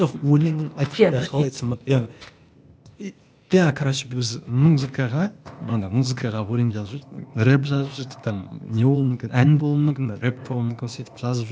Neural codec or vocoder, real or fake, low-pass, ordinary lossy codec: codec, 16 kHz, 0.8 kbps, ZipCodec; fake; none; none